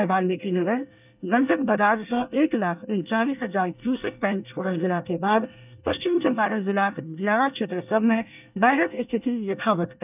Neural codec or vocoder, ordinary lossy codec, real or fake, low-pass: codec, 24 kHz, 1 kbps, SNAC; none; fake; 3.6 kHz